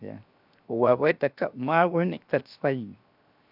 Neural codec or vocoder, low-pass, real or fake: codec, 16 kHz, 0.7 kbps, FocalCodec; 5.4 kHz; fake